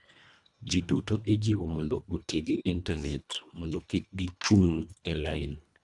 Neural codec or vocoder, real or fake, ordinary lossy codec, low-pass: codec, 24 kHz, 1.5 kbps, HILCodec; fake; none; 10.8 kHz